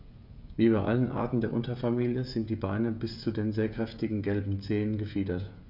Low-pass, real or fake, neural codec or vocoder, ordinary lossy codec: 5.4 kHz; fake; codec, 16 kHz, 16 kbps, FreqCodec, smaller model; none